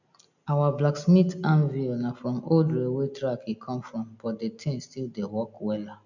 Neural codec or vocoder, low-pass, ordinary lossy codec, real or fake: none; 7.2 kHz; none; real